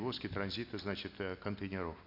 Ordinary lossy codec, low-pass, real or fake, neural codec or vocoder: none; 5.4 kHz; real; none